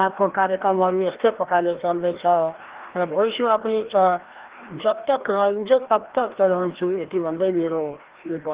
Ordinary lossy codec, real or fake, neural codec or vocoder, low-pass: Opus, 16 kbps; fake; codec, 16 kHz, 1 kbps, FreqCodec, larger model; 3.6 kHz